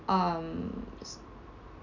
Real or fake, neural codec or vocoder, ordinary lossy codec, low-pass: real; none; none; 7.2 kHz